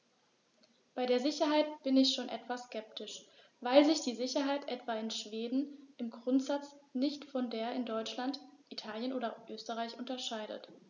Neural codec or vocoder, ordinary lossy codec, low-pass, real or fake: none; none; 7.2 kHz; real